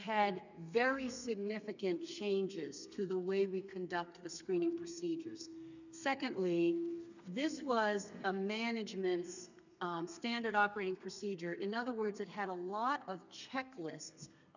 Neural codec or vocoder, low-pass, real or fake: codec, 32 kHz, 1.9 kbps, SNAC; 7.2 kHz; fake